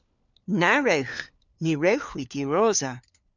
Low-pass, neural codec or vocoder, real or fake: 7.2 kHz; codec, 16 kHz, 4 kbps, FunCodec, trained on LibriTTS, 50 frames a second; fake